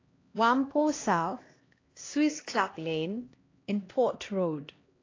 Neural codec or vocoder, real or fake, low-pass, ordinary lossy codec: codec, 16 kHz, 1 kbps, X-Codec, HuBERT features, trained on LibriSpeech; fake; 7.2 kHz; AAC, 32 kbps